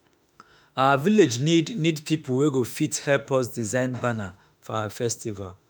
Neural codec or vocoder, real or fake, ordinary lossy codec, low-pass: autoencoder, 48 kHz, 32 numbers a frame, DAC-VAE, trained on Japanese speech; fake; none; none